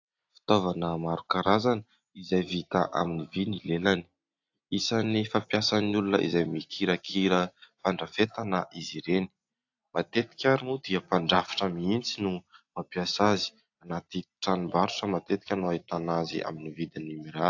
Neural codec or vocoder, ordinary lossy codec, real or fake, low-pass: none; AAC, 48 kbps; real; 7.2 kHz